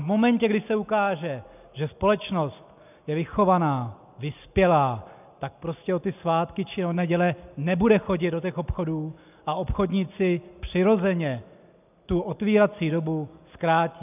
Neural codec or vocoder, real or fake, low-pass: none; real; 3.6 kHz